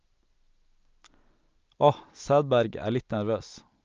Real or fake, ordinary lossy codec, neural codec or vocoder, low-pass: real; Opus, 24 kbps; none; 7.2 kHz